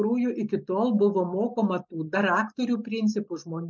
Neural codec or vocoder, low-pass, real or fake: none; 7.2 kHz; real